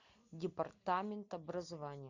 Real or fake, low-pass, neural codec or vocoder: real; 7.2 kHz; none